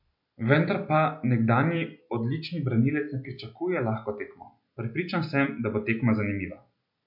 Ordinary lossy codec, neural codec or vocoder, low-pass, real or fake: MP3, 48 kbps; none; 5.4 kHz; real